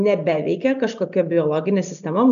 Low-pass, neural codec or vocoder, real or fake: 7.2 kHz; none; real